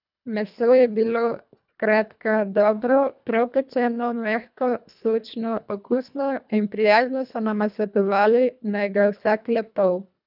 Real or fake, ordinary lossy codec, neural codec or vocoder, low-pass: fake; none; codec, 24 kHz, 1.5 kbps, HILCodec; 5.4 kHz